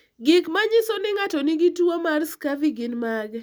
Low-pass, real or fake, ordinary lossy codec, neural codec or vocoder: none; real; none; none